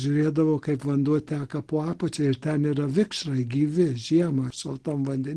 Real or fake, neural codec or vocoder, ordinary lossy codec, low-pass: real; none; Opus, 16 kbps; 10.8 kHz